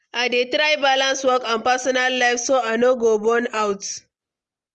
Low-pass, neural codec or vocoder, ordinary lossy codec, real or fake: 10.8 kHz; none; Opus, 24 kbps; real